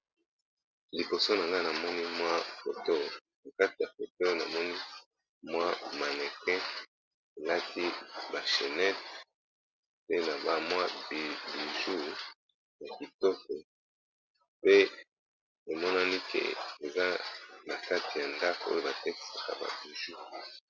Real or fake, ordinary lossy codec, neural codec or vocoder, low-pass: real; Opus, 64 kbps; none; 7.2 kHz